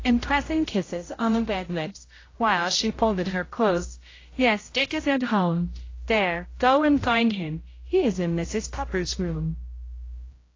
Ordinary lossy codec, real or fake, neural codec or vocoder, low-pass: AAC, 32 kbps; fake; codec, 16 kHz, 0.5 kbps, X-Codec, HuBERT features, trained on general audio; 7.2 kHz